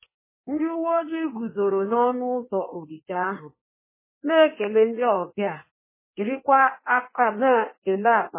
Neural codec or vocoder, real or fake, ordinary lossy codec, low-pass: codec, 16 kHz in and 24 kHz out, 1.1 kbps, FireRedTTS-2 codec; fake; MP3, 16 kbps; 3.6 kHz